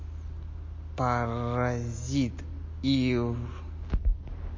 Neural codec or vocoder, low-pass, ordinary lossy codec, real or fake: none; 7.2 kHz; MP3, 32 kbps; real